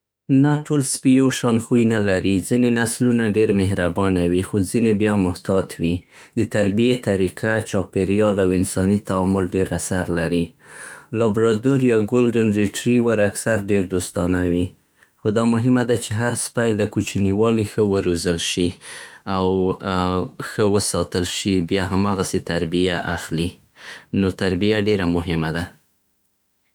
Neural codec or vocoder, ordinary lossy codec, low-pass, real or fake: autoencoder, 48 kHz, 32 numbers a frame, DAC-VAE, trained on Japanese speech; none; none; fake